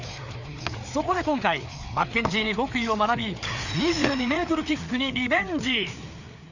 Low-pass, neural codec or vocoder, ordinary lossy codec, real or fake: 7.2 kHz; codec, 16 kHz, 4 kbps, FreqCodec, larger model; none; fake